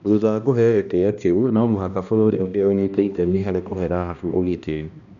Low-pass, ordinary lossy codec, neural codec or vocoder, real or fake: 7.2 kHz; none; codec, 16 kHz, 1 kbps, X-Codec, HuBERT features, trained on balanced general audio; fake